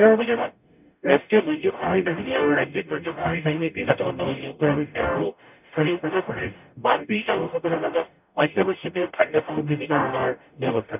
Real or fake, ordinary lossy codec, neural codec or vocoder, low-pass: fake; none; codec, 44.1 kHz, 0.9 kbps, DAC; 3.6 kHz